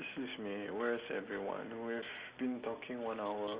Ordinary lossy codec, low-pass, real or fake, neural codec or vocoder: Opus, 32 kbps; 3.6 kHz; real; none